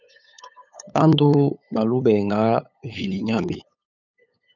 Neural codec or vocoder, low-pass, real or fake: codec, 16 kHz, 8 kbps, FunCodec, trained on LibriTTS, 25 frames a second; 7.2 kHz; fake